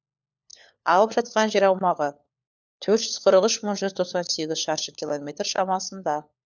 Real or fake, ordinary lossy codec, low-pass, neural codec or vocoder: fake; none; 7.2 kHz; codec, 16 kHz, 4 kbps, FunCodec, trained on LibriTTS, 50 frames a second